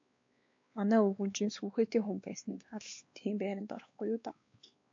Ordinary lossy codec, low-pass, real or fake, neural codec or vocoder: AAC, 48 kbps; 7.2 kHz; fake; codec, 16 kHz, 2 kbps, X-Codec, WavLM features, trained on Multilingual LibriSpeech